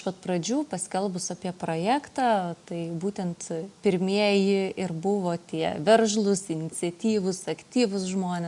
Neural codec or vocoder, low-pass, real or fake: none; 10.8 kHz; real